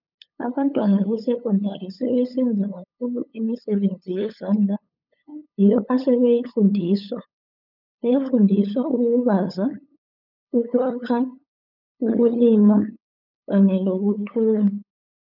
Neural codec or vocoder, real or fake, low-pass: codec, 16 kHz, 8 kbps, FunCodec, trained on LibriTTS, 25 frames a second; fake; 5.4 kHz